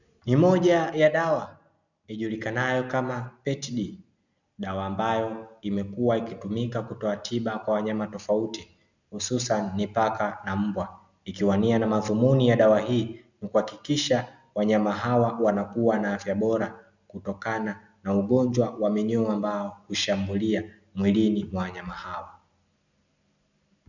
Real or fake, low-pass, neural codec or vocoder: real; 7.2 kHz; none